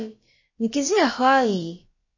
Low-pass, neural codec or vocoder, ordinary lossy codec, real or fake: 7.2 kHz; codec, 16 kHz, about 1 kbps, DyCAST, with the encoder's durations; MP3, 32 kbps; fake